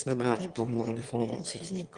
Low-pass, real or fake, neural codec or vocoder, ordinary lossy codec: 9.9 kHz; fake; autoencoder, 22.05 kHz, a latent of 192 numbers a frame, VITS, trained on one speaker; Opus, 24 kbps